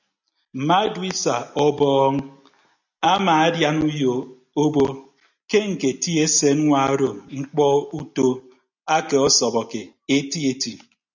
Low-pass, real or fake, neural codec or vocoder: 7.2 kHz; real; none